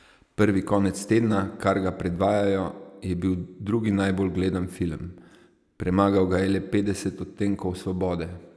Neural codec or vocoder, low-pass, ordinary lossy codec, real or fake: none; none; none; real